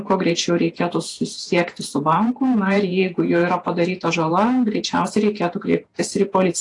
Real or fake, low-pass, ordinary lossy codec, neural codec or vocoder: fake; 10.8 kHz; AAC, 48 kbps; vocoder, 48 kHz, 128 mel bands, Vocos